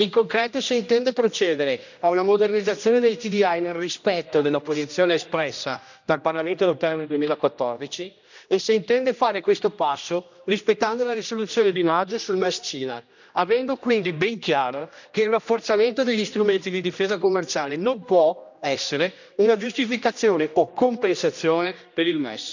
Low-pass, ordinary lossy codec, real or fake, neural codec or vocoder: 7.2 kHz; none; fake; codec, 16 kHz, 1 kbps, X-Codec, HuBERT features, trained on general audio